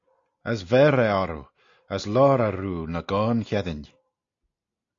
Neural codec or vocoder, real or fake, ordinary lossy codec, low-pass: none; real; AAC, 48 kbps; 7.2 kHz